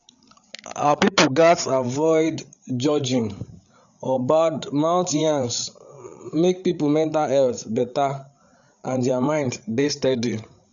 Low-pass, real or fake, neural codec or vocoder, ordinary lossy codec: 7.2 kHz; fake; codec, 16 kHz, 8 kbps, FreqCodec, larger model; none